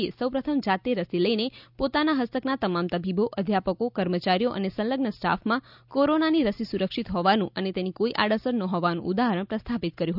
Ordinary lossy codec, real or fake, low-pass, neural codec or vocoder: none; real; 5.4 kHz; none